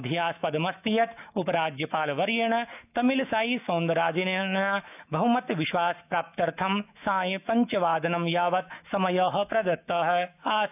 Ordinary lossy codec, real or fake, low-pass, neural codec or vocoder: none; fake; 3.6 kHz; autoencoder, 48 kHz, 128 numbers a frame, DAC-VAE, trained on Japanese speech